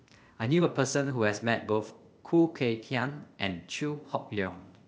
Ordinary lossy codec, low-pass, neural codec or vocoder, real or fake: none; none; codec, 16 kHz, 0.8 kbps, ZipCodec; fake